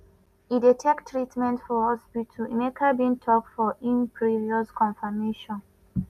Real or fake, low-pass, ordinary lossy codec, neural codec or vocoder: real; 14.4 kHz; none; none